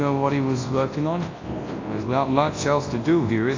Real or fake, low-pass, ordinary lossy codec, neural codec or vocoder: fake; 7.2 kHz; AAC, 32 kbps; codec, 24 kHz, 0.9 kbps, WavTokenizer, large speech release